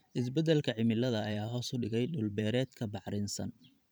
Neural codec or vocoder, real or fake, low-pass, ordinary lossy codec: vocoder, 44.1 kHz, 128 mel bands every 512 samples, BigVGAN v2; fake; none; none